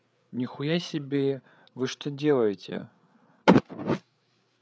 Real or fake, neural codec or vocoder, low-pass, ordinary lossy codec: fake; codec, 16 kHz, 8 kbps, FreqCodec, larger model; none; none